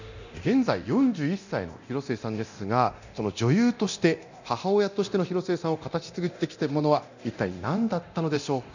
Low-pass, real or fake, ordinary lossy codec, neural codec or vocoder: 7.2 kHz; fake; none; codec, 24 kHz, 0.9 kbps, DualCodec